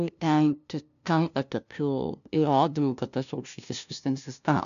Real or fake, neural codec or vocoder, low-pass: fake; codec, 16 kHz, 0.5 kbps, FunCodec, trained on LibriTTS, 25 frames a second; 7.2 kHz